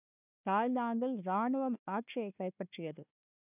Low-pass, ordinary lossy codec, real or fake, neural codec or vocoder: 3.6 kHz; none; fake; codec, 16 kHz, 1 kbps, FunCodec, trained on Chinese and English, 50 frames a second